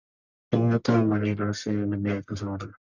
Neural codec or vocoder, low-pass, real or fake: codec, 44.1 kHz, 1.7 kbps, Pupu-Codec; 7.2 kHz; fake